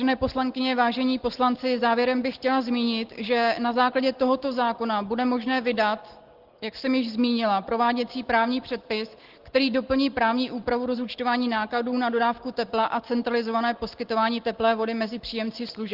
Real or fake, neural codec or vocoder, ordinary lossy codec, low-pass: real; none; Opus, 16 kbps; 5.4 kHz